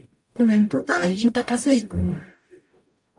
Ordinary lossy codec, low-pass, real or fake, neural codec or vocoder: MP3, 64 kbps; 10.8 kHz; fake; codec, 44.1 kHz, 0.9 kbps, DAC